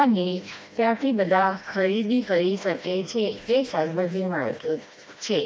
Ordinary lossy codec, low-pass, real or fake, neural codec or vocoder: none; none; fake; codec, 16 kHz, 1 kbps, FreqCodec, smaller model